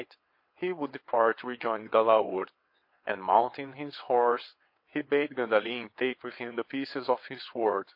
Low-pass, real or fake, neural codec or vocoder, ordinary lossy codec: 5.4 kHz; fake; vocoder, 22.05 kHz, 80 mel bands, WaveNeXt; MP3, 32 kbps